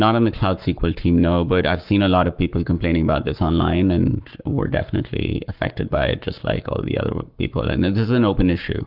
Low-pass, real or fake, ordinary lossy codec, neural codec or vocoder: 5.4 kHz; fake; Opus, 32 kbps; codec, 44.1 kHz, 7.8 kbps, Pupu-Codec